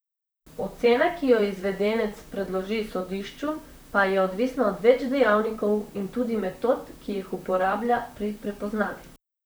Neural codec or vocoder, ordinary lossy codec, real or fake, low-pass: vocoder, 44.1 kHz, 128 mel bands, Pupu-Vocoder; none; fake; none